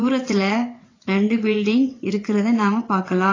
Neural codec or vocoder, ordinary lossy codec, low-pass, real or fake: vocoder, 22.05 kHz, 80 mel bands, WaveNeXt; AAC, 32 kbps; 7.2 kHz; fake